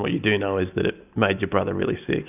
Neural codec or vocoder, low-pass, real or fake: none; 3.6 kHz; real